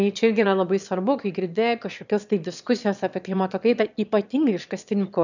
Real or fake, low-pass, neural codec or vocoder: fake; 7.2 kHz; autoencoder, 22.05 kHz, a latent of 192 numbers a frame, VITS, trained on one speaker